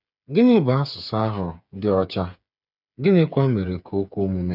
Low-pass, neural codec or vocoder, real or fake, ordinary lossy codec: 5.4 kHz; codec, 16 kHz, 8 kbps, FreqCodec, smaller model; fake; none